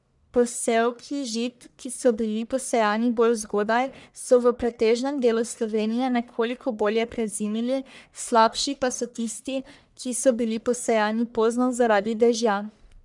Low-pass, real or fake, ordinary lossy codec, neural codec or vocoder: 10.8 kHz; fake; none; codec, 44.1 kHz, 1.7 kbps, Pupu-Codec